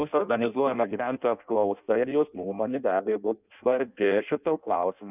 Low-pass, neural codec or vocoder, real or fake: 3.6 kHz; codec, 16 kHz in and 24 kHz out, 0.6 kbps, FireRedTTS-2 codec; fake